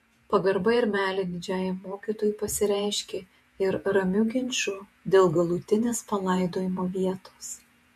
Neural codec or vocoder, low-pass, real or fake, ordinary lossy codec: vocoder, 48 kHz, 128 mel bands, Vocos; 14.4 kHz; fake; MP3, 64 kbps